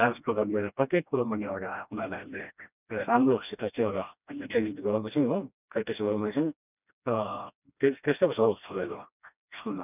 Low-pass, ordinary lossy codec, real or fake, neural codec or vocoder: 3.6 kHz; none; fake; codec, 16 kHz, 1 kbps, FreqCodec, smaller model